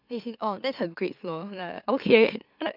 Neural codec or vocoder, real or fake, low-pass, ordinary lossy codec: autoencoder, 44.1 kHz, a latent of 192 numbers a frame, MeloTTS; fake; 5.4 kHz; none